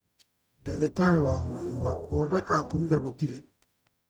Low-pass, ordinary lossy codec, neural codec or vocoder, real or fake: none; none; codec, 44.1 kHz, 0.9 kbps, DAC; fake